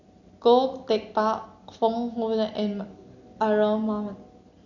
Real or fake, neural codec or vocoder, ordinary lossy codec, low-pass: real; none; none; 7.2 kHz